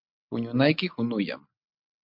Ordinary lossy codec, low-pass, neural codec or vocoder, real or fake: MP3, 48 kbps; 5.4 kHz; none; real